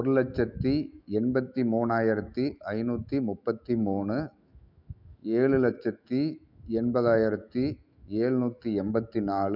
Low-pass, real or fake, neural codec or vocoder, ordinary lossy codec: 5.4 kHz; fake; autoencoder, 48 kHz, 128 numbers a frame, DAC-VAE, trained on Japanese speech; none